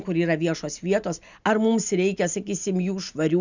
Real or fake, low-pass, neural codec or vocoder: real; 7.2 kHz; none